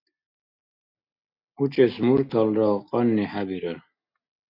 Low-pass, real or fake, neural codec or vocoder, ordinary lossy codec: 5.4 kHz; real; none; MP3, 48 kbps